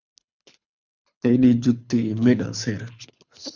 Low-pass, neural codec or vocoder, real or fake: 7.2 kHz; codec, 24 kHz, 6 kbps, HILCodec; fake